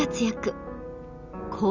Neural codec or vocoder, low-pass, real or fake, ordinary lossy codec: none; 7.2 kHz; real; none